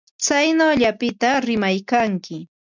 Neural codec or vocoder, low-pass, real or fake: none; 7.2 kHz; real